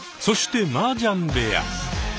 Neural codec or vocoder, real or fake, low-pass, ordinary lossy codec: none; real; none; none